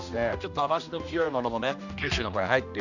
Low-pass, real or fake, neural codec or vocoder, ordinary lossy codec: 7.2 kHz; fake; codec, 16 kHz, 1 kbps, X-Codec, HuBERT features, trained on general audio; none